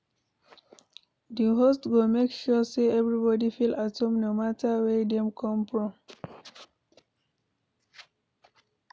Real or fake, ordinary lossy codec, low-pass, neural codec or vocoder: real; none; none; none